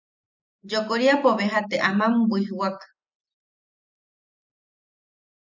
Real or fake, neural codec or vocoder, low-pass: real; none; 7.2 kHz